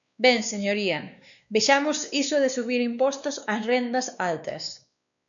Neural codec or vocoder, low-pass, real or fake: codec, 16 kHz, 2 kbps, X-Codec, WavLM features, trained on Multilingual LibriSpeech; 7.2 kHz; fake